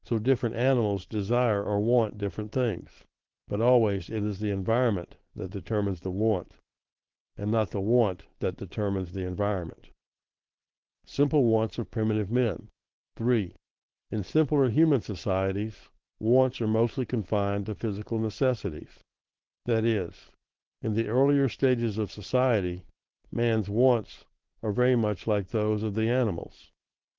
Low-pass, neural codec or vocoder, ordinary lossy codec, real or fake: 7.2 kHz; codec, 16 kHz, 4.8 kbps, FACodec; Opus, 16 kbps; fake